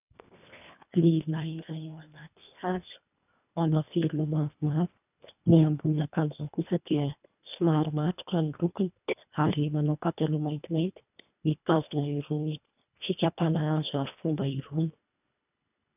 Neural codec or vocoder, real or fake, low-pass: codec, 24 kHz, 1.5 kbps, HILCodec; fake; 3.6 kHz